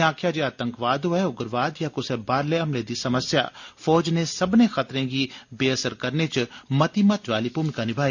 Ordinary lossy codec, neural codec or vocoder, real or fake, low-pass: none; none; real; 7.2 kHz